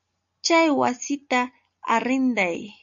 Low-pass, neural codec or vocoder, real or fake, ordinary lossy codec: 7.2 kHz; none; real; MP3, 96 kbps